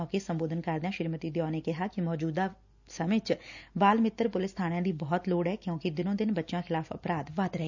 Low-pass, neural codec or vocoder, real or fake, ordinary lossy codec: 7.2 kHz; none; real; none